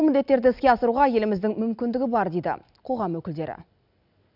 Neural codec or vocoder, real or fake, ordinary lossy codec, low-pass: none; real; none; 5.4 kHz